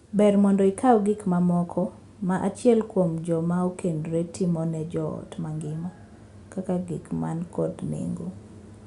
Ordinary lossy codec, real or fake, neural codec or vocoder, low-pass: Opus, 64 kbps; real; none; 10.8 kHz